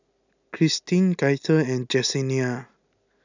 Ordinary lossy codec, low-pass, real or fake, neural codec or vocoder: none; 7.2 kHz; real; none